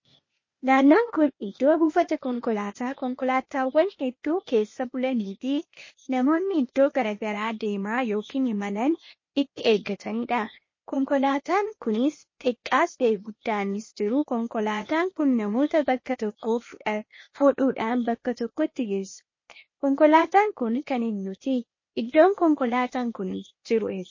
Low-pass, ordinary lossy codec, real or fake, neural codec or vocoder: 7.2 kHz; MP3, 32 kbps; fake; codec, 16 kHz, 0.8 kbps, ZipCodec